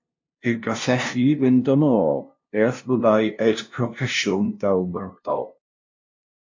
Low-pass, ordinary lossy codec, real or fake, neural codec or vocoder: 7.2 kHz; MP3, 48 kbps; fake; codec, 16 kHz, 0.5 kbps, FunCodec, trained on LibriTTS, 25 frames a second